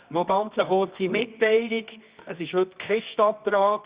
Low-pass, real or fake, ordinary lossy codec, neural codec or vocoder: 3.6 kHz; fake; Opus, 64 kbps; codec, 24 kHz, 0.9 kbps, WavTokenizer, medium music audio release